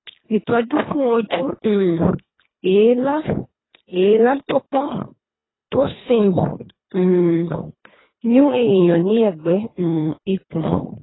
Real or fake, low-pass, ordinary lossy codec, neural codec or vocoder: fake; 7.2 kHz; AAC, 16 kbps; codec, 24 kHz, 1.5 kbps, HILCodec